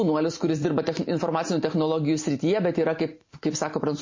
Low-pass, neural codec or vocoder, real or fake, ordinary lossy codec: 7.2 kHz; none; real; MP3, 32 kbps